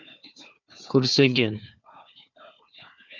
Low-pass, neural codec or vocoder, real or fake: 7.2 kHz; codec, 16 kHz, 4 kbps, FunCodec, trained on Chinese and English, 50 frames a second; fake